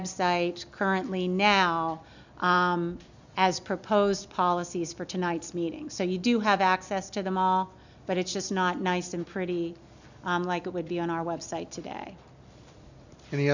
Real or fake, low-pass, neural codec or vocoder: real; 7.2 kHz; none